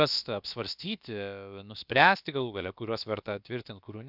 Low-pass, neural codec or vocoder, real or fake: 5.4 kHz; codec, 16 kHz, about 1 kbps, DyCAST, with the encoder's durations; fake